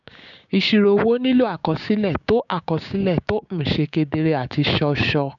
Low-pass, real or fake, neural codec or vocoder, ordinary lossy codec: 7.2 kHz; fake; codec, 16 kHz, 6 kbps, DAC; none